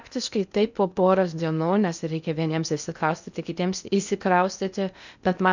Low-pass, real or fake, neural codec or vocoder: 7.2 kHz; fake; codec, 16 kHz in and 24 kHz out, 0.6 kbps, FocalCodec, streaming, 2048 codes